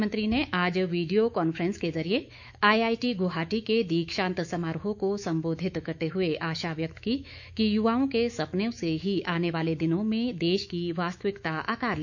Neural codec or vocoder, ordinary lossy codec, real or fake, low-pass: autoencoder, 48 kHz, 128 numbers a frame, DAC-VAE, trained on Japanese speech; none; fake; 7.2 kHz